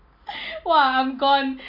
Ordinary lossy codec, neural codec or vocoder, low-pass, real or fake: none; none; 5.4 kHz; real